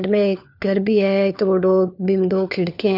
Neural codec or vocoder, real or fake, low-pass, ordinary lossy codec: codec, 16 kHz, 4 kbps, FunCodec, trained on LibriTTS, 50 frames a second; fake; 5.4 kHz; none